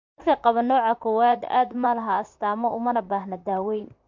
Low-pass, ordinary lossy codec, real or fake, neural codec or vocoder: 7.2 kHz; MP3, 48 kbps; fake; vocoder, 44.1 kHz, 128 mel bands, Pupu-Vocoder